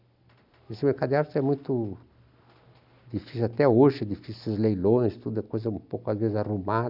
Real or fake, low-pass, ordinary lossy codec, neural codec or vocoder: real; 5.4 kHz; none; none